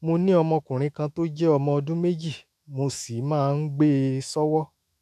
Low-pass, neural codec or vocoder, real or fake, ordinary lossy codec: 14.4 kHz; autoencoder, 48 kHz, 128 numbers a frame, DAC-VAE, trained on Japanese speech; fake; none